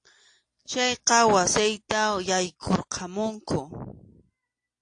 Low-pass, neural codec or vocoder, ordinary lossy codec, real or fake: 9.9 kHz; none; AAC, 48 kbps; real